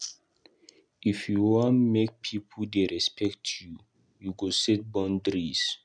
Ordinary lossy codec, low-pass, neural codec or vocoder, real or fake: none; 9.9 kHz; none; real